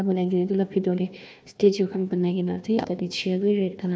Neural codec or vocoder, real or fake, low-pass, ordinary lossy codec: codec, 16 kHz, 1 kbps, FunCodec, trained on Chinese and English, 50 frames a second; fake; none; none